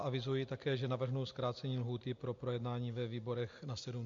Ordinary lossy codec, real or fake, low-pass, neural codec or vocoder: MP3, 48 kbps; real; 7.2 kHz; none